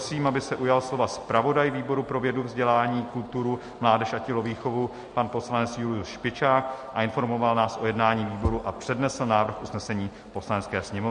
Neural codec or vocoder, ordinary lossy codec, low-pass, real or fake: none; MP3, 48 kbps; 10.8 kHz; real